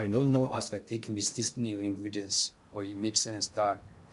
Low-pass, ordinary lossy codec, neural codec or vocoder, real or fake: 10.8 kHz; MP3, 64 kbps; codec, 16 kHz in and 24 kHz out, 0.6 kbps, FocalCodec, streaming, 2048 codes; fake